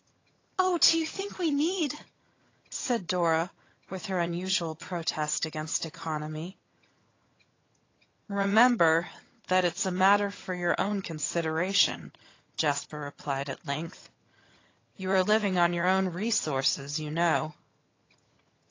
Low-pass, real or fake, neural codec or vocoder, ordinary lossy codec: 7.2 kHz; fake; vocoder, 22.05 kHz, 80 mel bands, HiFi-GAN; AAC, 32 kbps